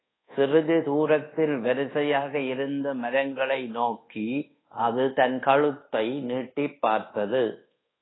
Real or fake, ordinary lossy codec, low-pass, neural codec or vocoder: fake; AAC, 16 kbps; 7.2 kHz; codec, 24 kHz, 1.2 kbps, DualCodec